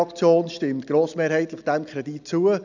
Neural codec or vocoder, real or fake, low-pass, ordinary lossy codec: none; real; 7.2 kHz; none